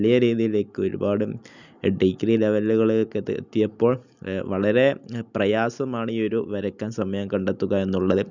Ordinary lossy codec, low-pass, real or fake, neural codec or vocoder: none; 7.2 kHz; real; none